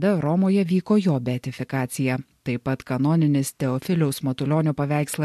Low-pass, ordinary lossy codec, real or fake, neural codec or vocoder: 14.4 kHz; MP3, 64 kbps; real; none